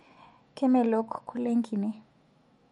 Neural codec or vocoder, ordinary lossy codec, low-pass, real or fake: autoencoder, 48 kHz, 128 numbers a frame, DAC-VAE, trained on Japanese speech; MP3, 48 kbps; 19.8 kHz; fake